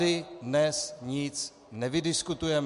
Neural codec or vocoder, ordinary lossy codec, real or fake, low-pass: none; MP3, 64 kbps; real; 10.8 kHz